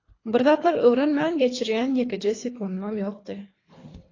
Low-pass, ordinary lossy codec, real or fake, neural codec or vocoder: 7.2 kHz; AAC, 32 kbps; fake; codec, 24 kHz, 3 kbps, HILCodec